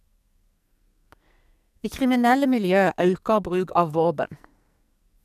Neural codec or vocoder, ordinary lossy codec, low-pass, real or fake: codec, 44.1 kHz, 2.6 kbps, SNAC; none; 14.4 kHz; fake